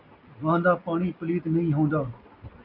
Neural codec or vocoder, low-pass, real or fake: none; 5.4 kHz; real